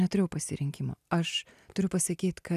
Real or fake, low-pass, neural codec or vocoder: real; 14.4 kHz; none